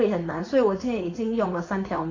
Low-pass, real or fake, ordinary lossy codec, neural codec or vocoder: 7.2 kHz; fake; AAC, 32 kbps; codec, 16 kHz, 4.8 kbps, FACodec